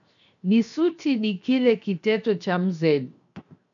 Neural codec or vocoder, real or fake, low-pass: codec, 16 kHz, 0.7 kbps, FocalCodec; fake; 7.2 kHz